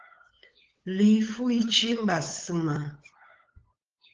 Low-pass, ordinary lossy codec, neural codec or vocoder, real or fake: 7.2 kHz; Opus, 16 kbps; codec, 16 kHz, 8 kbps, FunCodec, trained on LibriTTS, 25 frames a second; fake